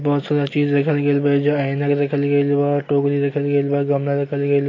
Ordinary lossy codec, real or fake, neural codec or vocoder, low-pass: AAC, 32 kbps; real; none; 7.2 kHz